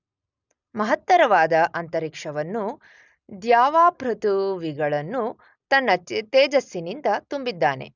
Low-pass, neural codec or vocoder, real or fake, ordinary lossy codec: 7.2 kHz; none; real; none